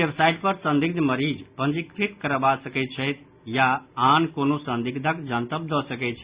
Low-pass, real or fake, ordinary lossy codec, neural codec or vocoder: 3.6 kHz; real; Opus, 64 kbps; none